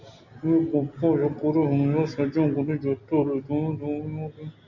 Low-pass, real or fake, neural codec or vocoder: 7.2 kHz; real; none